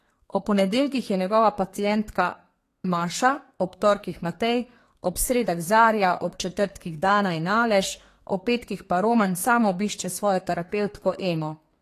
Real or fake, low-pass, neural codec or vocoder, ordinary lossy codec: fake; 14.4 kHz; codec, 32 kHz, 1.9 kbps, SNAC; AAC, 48 kbps